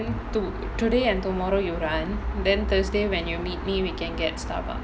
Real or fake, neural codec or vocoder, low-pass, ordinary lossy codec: real; none; none; none